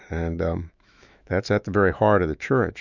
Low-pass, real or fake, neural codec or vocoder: 7.2 kHz; fake; autoencoder, 48 kHz, 128 numbers a frame, DAC-VAE, trained on Japanese speech